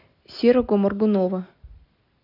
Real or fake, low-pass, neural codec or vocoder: real; 5.4 kHz; none